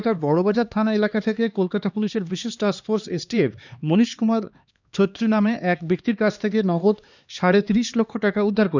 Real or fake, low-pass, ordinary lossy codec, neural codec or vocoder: fake; 7.2 kHz; none; codec, 16 kHz, 2 kbps, X-Codec, HuBERT features, trained on LibriSpeech